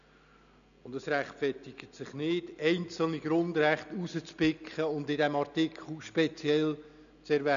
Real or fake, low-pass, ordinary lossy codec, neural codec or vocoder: real; 7.2 kHz; none; none